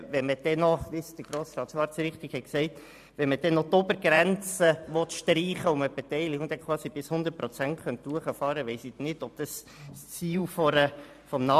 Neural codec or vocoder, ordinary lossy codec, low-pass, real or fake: vocoder, 44.1 kHz, 128 mel bands every 512 samples, BigVGAN v2; AAC, 96 kbps; 14.4 kHz; fake